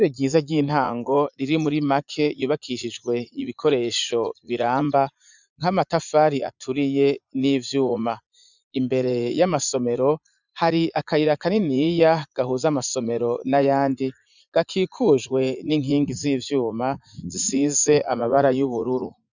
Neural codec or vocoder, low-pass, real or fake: vocoder, 44.1 kHz, 80 mel bands, Vocos; 7.2 kHz; fake